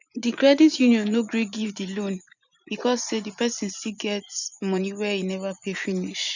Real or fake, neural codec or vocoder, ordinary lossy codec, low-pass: fake; vocoder, 24 kHz, 100 mel bands, Vocos; none; 7.2 kHz